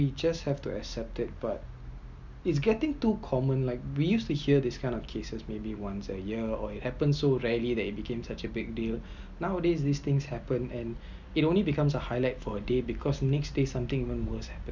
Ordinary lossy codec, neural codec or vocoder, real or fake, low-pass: Opus, 64 kbps; none; real; 7.2 kHz